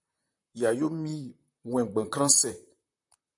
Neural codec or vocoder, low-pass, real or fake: vocoder, 44.1 kHz, 128 mel bands, Pupu-Vocoder; 10.8 kHz; fake